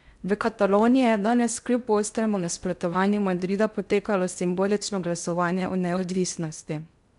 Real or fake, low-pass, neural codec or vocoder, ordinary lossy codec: fake; 10.8 kHz; codec, 16 kHz in and 24 kHz out, 0.6 kbps, FocalCodec, streaming, 4096 codes; none